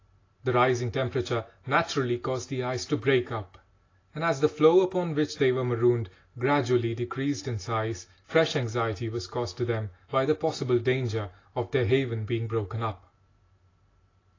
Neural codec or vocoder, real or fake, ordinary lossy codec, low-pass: none; real; AAC, 32 kbps; 7.2 kHz